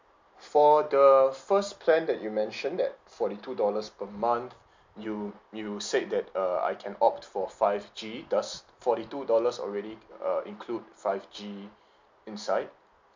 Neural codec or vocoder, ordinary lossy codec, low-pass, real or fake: none; MP3, 64 kbps; 7.2 kHz; real